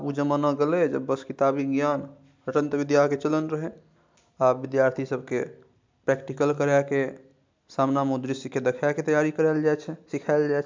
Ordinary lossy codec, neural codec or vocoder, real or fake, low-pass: MP3, 64 kbps; none; real; 7.2 kHz